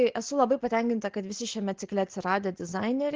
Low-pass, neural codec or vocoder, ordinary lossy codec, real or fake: 7.2 kHz; none; Opus, 16 kbps; real